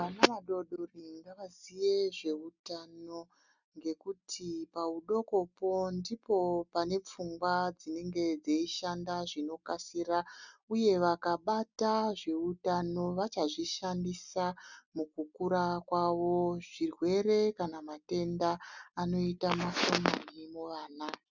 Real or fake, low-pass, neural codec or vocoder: real; 7.2 kHz; none